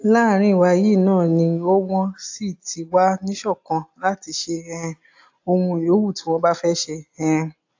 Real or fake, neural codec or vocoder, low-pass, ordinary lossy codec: real; none; 7.2 kHz; AAC, 48 kbps